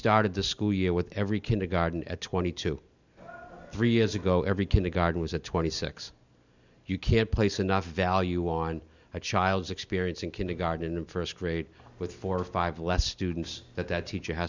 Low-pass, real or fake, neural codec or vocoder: 7.2 kHz; real; none